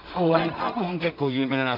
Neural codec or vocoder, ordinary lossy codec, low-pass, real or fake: codec, 16 kHz in and 24 kHz out, 0.4 kbps, LongCat-Audio-Codec, two codebook decoder; none; 5.4 kHz; fake